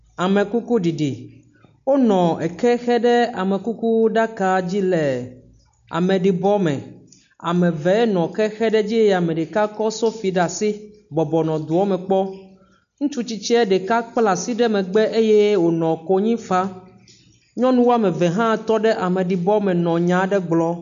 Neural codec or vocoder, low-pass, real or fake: none; 7.2 kHz; real